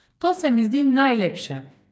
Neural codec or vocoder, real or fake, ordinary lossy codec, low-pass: codec, 16 kHz, 2 kbps, FreqCodec, smaller model; fake; none; none